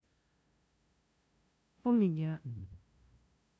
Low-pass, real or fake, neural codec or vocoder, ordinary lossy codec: none; fake; codec, 16 kHz, 0.5 kbps, FunCodec, trained on LibriTTS, 25 frames a second; none